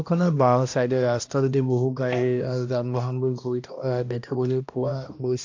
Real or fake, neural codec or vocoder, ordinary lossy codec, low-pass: fake; codec, 16 kHz, 1 kbps, X-Codec, HuBERT features, trained on balanced general audio; AAC, 48 kbps; 7.2 kHz